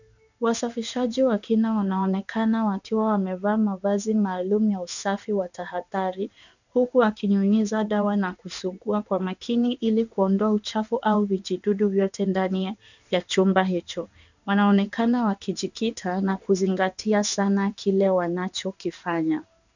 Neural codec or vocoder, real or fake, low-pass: codec, 16 kHz in and 24 kHz out, 1 kbps, XY-Tokenizer; fake; 7.2 kHz